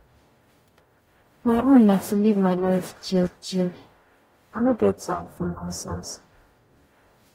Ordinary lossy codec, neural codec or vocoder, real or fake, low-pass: AAC, 48 kbps; codec, 44.1 kHz, 0.9 kbps, DAC; fake; 19.8 kHz